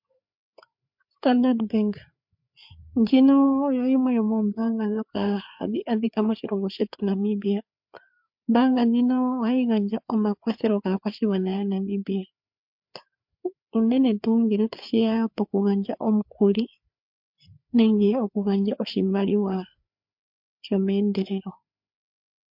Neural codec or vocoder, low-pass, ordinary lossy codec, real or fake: codec, 16 kHz, 4 kbps, FreqCodec, larger model; 5.4 kHz; MP3, 48 kbps; fake